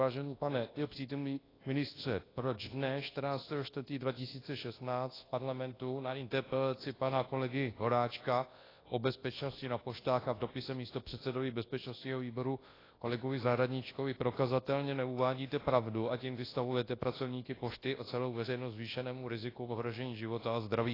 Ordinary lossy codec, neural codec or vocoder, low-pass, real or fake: AAC, 24 kbps; codec, 24 kHz, 0.9 kbps, WavTokenizer, large speech release; 5.4 kHz; fake